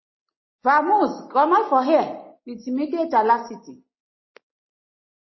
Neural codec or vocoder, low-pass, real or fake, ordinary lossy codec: none; 7.2 kHz; real; MP3, 24 kbps